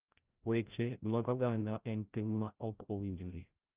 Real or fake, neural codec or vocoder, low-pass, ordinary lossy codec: fake; codec, 16 kHz, 0.5 kbps, FreqCodec, larger model; 3.6 kHz; Opus, 32 kbps